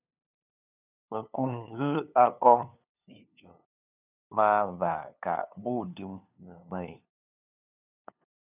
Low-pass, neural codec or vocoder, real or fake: 3.6 kHz; codec, 16 kHz, 2 kbps, FunCodec, trained on LibriTTS, 25 frames a second; fake